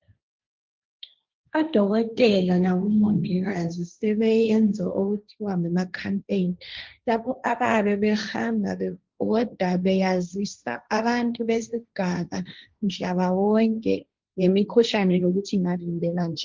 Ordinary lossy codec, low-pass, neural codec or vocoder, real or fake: Opus, 32 kbps; 7.2 kHz; codec, 16 kHz, 1.1 kbps, Voila-Tokenizer; fake